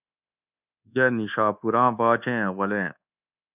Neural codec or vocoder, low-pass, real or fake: codec, 24 kHz, 1.2 kbps, DualCodec; 3.6 kHz; fake